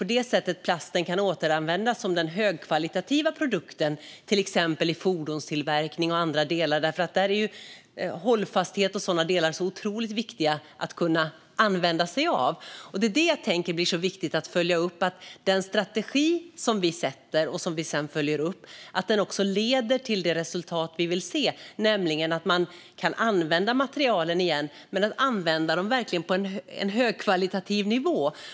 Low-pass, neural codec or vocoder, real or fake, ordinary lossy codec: none; none; real; none